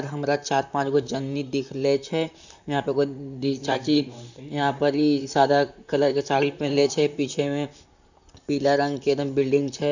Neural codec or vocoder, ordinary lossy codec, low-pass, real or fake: vocoder, 44.1 kHz, 128 mel bands, Pupu-Vocoder; none; 7.2 kHz; fake